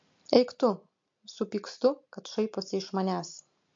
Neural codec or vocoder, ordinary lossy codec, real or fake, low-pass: none; MP3, 48 kbps; real; 7.2 kHz